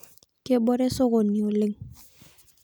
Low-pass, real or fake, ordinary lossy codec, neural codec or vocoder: none; real; none; none